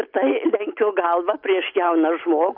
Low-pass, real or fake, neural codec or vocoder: 5.4 kHz; real; none